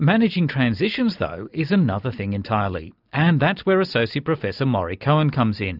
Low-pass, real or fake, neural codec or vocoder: 5.4 kHz; real; none